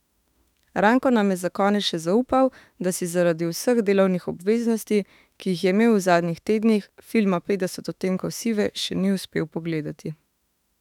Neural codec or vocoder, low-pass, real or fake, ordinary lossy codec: autoencoder, 48 kHz, 32 numbers a frame, DAC-VAE, trained on Japanese speech; 19.8 kHz; fake; none